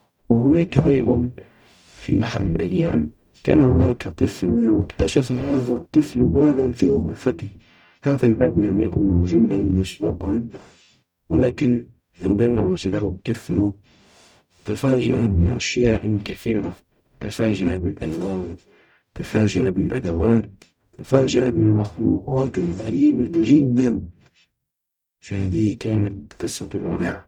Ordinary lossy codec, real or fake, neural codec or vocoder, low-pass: none; fake; codec, 44.1 kHz, 0.9 kbps, DAC; 19.8 kHz